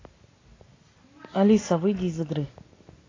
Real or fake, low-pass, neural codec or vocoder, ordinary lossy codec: real; 7.2 kHz; none; AAC, 32 kbps